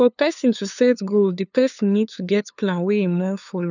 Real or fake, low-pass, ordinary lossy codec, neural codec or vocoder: fake; 7.2 kHz; none; codec, 16 kHz, 2 kbps, FunCodec, trained on LibriTTS, 25 frames a second